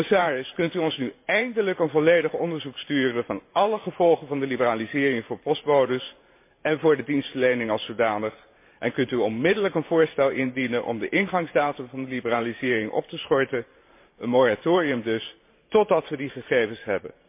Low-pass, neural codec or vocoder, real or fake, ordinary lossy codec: 3.6 kHz; vocoder, 44.1 kHz, 128 mel bands every 512 samples, BigVGAN v2; fake; MP3, 24 kbps